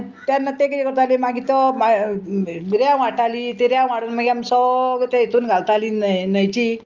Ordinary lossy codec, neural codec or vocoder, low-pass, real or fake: Opus, 24 kbps; none; 7.2 kHz; real